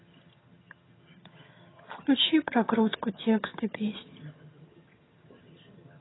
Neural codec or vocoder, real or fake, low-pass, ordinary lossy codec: vocoder, 22.05 kHz, 80 mel bands, HiFi-GAN; fake; 7.2 kHz; AAC, 16 kbps